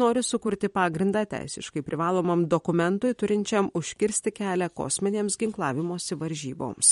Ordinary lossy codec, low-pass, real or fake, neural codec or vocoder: MP3, 48 kbps; 19.8 kHz; fake; vocoder, 44.1 kHz, 128 mel bands every 256 samples, BigVGAN v2